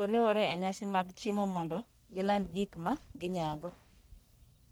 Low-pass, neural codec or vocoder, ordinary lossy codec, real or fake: none; codec, 44.1 kHz, 1.7 kbps, Pupu-Codec; none; fake